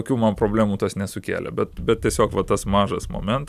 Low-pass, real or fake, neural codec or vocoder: 14.4 kHz; real; none